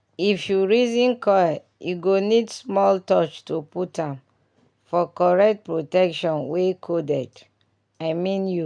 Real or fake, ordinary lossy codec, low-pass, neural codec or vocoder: real; none; 9.9 kHz; none